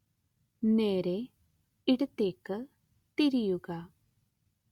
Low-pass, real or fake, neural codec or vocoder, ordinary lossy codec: 19.8 kHz; real; none; Opus, 64 kbps